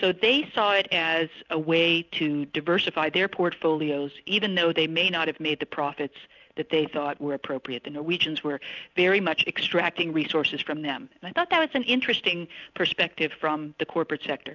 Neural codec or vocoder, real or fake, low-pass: none; real; 7.2 kHz